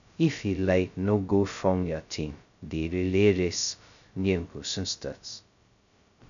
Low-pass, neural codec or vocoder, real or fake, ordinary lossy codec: 7.2 kHz; codec, 16 kHz, 0.2 kbps, FocalCodec; fake; MP3, 96 kbps